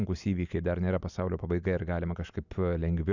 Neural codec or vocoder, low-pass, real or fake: none; 7.2 kHz; real